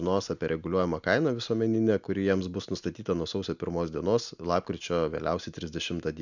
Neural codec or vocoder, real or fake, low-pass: none; real; 7.2 kHz